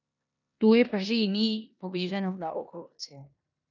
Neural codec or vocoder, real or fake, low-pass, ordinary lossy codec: codec, 16 kHz in and 24 kHz out, 0.9 kbps, LongCat-Audio-Codec, four codebook decoder; fake; 7.2 kHz; none